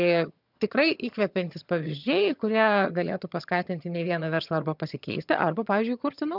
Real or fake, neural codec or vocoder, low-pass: fake; vocoder, 22.05 kHz, 80 mel bands, HiFi-GAN; 5.4 kHz